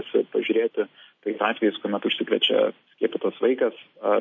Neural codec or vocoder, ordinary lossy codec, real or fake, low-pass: none; MP3, 32 kbps; real; 7.2 kHz